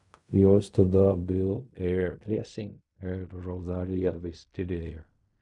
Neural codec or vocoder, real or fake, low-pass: codec, 16 kHz in and 24 kHz out, 0.4 kbps, LongCat-Audio-Codec, fine tuned four codebook decoder; fake; 10.8 kHz